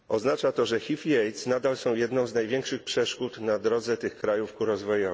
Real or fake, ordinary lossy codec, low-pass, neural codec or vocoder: real; none; none; none